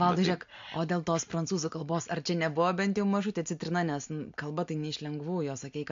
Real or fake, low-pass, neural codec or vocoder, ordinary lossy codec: real; 7.2 kHz; none; MP3, 48 kbps